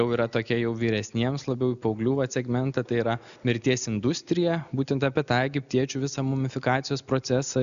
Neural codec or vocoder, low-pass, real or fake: none; 7.2 kHz; real